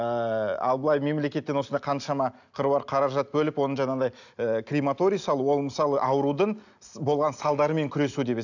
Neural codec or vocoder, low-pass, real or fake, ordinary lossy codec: none; 7.2 kHz; real; none